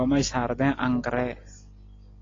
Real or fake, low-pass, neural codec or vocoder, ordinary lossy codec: real; 7.2 kHz; none; AAC, 32 kbps